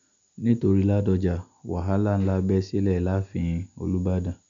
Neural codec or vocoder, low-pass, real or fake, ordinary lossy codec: none; 7.2 kHz; real; none